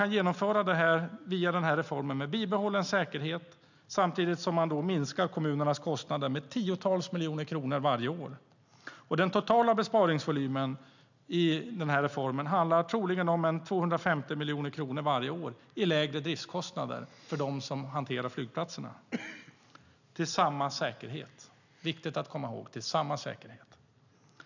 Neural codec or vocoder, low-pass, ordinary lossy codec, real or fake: none; 7.2 kHz; none; real